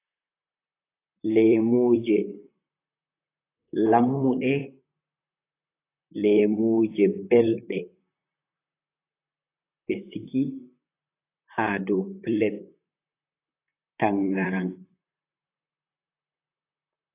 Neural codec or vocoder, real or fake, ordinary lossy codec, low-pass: vocoder, 44.1 kHz, 128 mel bands, Pupu-Vocoder; fake; AAC, 24 kbps; 3.6 kHz